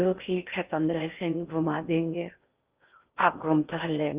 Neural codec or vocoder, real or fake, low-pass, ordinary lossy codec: codec, 16 kHz in and 24 kHz out, 0.6 kbps, FocalCodec, streaming, 4096 codes; fake; 3.6 kHz; Opus, 24 kbps